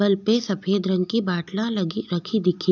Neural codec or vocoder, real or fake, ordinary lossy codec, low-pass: none; real; none; 7.2 kHz